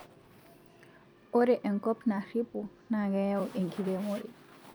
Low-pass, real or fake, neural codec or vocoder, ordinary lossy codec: none; fake; vocoder, 44.1 kHz, 128 mel bands every 256 samples, BigVGAN v2; none